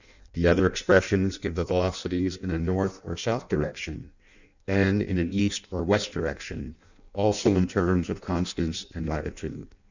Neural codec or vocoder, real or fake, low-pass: codec, 16 kHz in and 24 kHz out, 0.6 kbps, FireRedTTS-2 codec; fake; 7.2 kHz